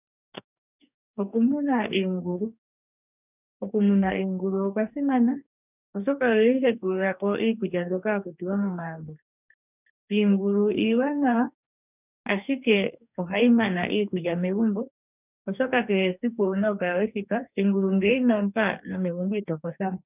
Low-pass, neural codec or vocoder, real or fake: 3.6 kHz; codec, 44.1 kHz, 2.6 kbps, DAC; fake